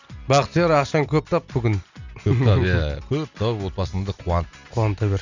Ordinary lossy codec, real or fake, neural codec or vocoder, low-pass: none; real; none; 7.2 kHz